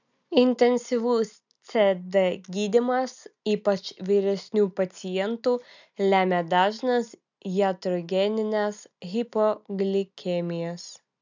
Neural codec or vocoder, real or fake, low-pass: none; real; 7.2 kHz